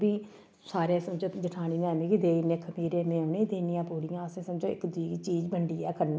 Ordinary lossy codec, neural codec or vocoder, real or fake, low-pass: none; none; real; none